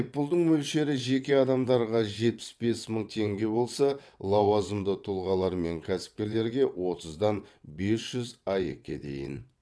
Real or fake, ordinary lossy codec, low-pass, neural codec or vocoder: fake; none; none; vocoder, 22.05 kHz, 80 mel bands, WaveNeXt